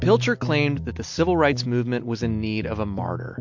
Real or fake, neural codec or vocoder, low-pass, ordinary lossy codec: real; none; 7.2 kHz; MP3, 48 kbps